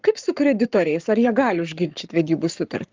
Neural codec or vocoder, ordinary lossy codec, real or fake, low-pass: codec, 44.1 kHz, 7.8 kbps, Pupu-Codec; Opus, 32 kbps; fake; 7.2 kHz